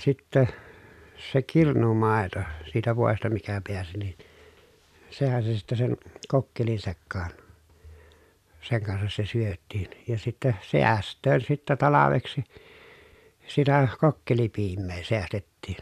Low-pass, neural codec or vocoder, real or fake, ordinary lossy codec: 14.4 kHz; none; real; none